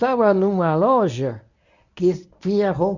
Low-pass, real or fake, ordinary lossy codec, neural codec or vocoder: 7.2 kHz; fake; none; codec, 24 kHz, 0.9 kbps, WavTokenizer, medium speech release version 1